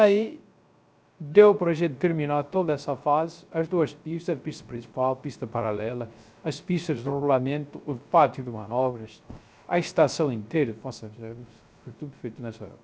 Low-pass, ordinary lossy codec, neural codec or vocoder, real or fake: none; none; codec, 16 kHz, 0.3 kbps, FocalCodec; fake